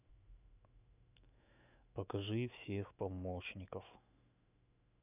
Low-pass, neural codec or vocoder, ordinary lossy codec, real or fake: 3.6 kHz; autoencoder, 48 kHz, 128 numbers a frame, DAC-VAE, trained on Japanese speech; none; fake